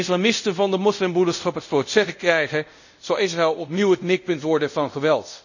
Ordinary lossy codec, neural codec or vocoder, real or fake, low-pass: none; codec, 24 kHz, 0.5 kbps, DualCodec; fake; 7.2 kHz